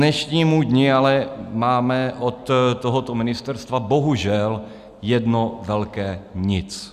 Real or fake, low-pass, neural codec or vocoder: real; 14.4 kHz; none